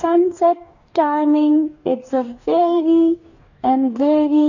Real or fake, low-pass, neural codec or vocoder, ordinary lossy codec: fake; 7.2 kHz; codec, 44.1 kHz, 2.6 kbps, DAC; none